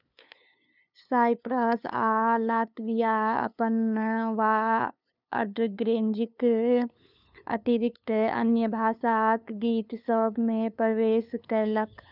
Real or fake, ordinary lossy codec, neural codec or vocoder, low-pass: fake; none; codec, 16 kHz, 2 kbps, FunCodec, trained on LibriTTS, 25 frames a second; 5.4 kHz